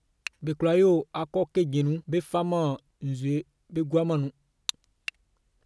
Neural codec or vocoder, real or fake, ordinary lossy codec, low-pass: none; real; none; none